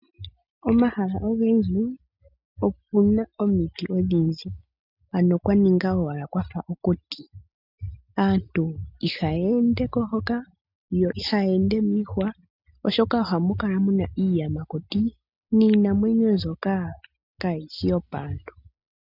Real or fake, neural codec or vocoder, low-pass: real; none; 5.4 kHz